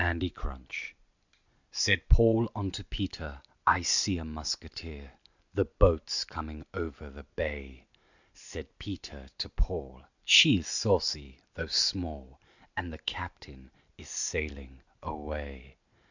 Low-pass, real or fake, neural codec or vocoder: 7.2 kHz; real; none